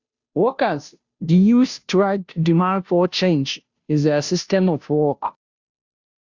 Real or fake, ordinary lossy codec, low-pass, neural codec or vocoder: fake; Opus, 64 kbps; 7.2 kHz; codec, 16 kHz, 0.5 kbps, FunCodec, trained on Chinese and English, 25 frames a second